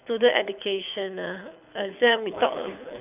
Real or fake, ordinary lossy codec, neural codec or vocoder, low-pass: fake; none; codec, 24 kHz, 6 kbps, HILCodec; 3.6 kHz